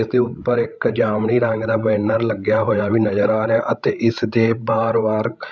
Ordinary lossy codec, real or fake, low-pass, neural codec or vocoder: none; fake; none; codec, 16 kHz, 16 kbps, FreqCodec, larger model